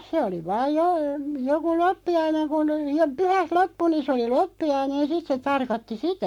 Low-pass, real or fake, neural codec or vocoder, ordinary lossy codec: 19.8 kHz; fake; codec, 44.1 kHz, 7.8 kbps, Pupu-Codec; MP3, 96 kbps